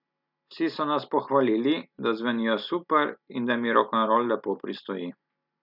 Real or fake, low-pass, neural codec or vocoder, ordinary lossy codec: real; 5.4 kHz; none; none